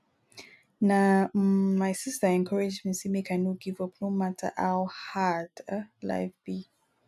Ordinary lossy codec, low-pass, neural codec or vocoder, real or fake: none; 14.4 kHz; none; real